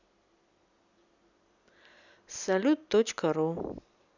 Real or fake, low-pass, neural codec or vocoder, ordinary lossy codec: real; 7.2 kHz; none; none